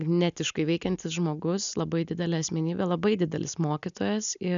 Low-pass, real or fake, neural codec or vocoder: 7.2 kHz; real; none